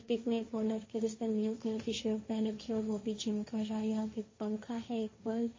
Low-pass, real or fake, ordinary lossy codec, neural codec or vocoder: 7.2 kHz; fake; MP3, 32 kbps; codec, 16 kHz, 1.1 kbps, Voila-Tokenizer